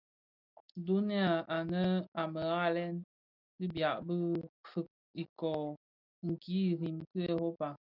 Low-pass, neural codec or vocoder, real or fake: 5.4 kHz; none; real